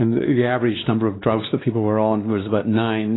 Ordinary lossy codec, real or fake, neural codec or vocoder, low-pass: AAC, 16 kbps; fake; codec, 16 kHz, 2 kbps, X-Codec, WavLM features, trained on Multilingual LibriSpeech; 7.2 kHz